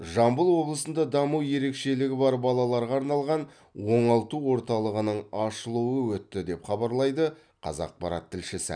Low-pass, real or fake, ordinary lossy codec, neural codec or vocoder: 9.9 kHz; real; none; none